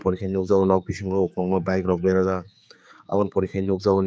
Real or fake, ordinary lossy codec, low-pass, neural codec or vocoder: fake; none; none; codec, 16 kHz, 4 kbps, X-Codec, HuBERT features, trained on general audio